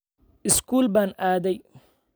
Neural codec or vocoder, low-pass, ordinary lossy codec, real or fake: none; none; none; real